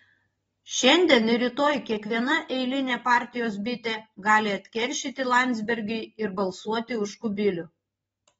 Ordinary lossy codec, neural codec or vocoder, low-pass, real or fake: AAC, 24 kbps; none; 19.8 kHz; real